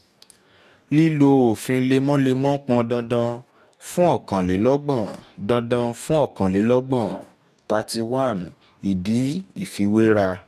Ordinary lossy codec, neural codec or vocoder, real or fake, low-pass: none; codec, 44.1 kHz, 2.6 kbps, DAC; fake; 14.4 kHz